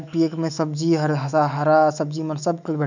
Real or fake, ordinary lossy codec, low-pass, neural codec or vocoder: fake; none; 7.2 kHz; autoencoder, 48 kHz, 128 numbers a frame, DAC-VAE, trained on Japanese speech